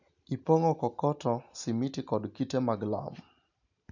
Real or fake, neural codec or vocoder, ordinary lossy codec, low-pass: real; none; none; 7.2 kHz